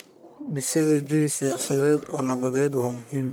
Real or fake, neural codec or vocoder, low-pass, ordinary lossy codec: fake; codec, 44.1 kHz, 1.7 kbps, Pupu-Codec; none; none